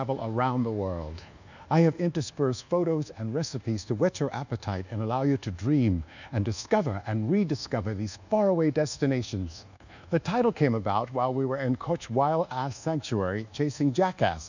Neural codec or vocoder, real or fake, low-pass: codec, 24 kHz, 1.2 kbps, DualCodec; fake; 7.2 kHz